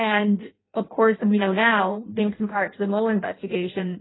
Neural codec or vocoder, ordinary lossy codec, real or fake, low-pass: codec, 16 kHz in and 24 kHz out, 0.6 kbps, FireRedTTS-2 codec; AAC, 16 kbps; fake; 7.2 kHz